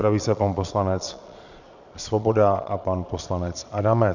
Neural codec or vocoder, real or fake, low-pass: vocoder, 22.05 kHz, 80 mel bands, Vocos; fake; 7.2 kHz